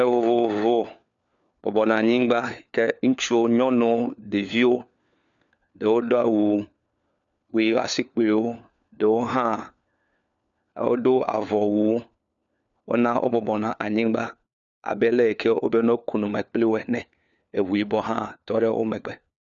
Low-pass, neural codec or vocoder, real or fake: 7.2 kHz; codec, 16 kHz, 4 kbps, FunCodec, trained on LibriTTS, 50 frames a second; fake